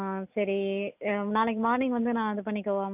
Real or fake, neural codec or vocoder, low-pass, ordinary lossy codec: real; none; 3.6 kHz; none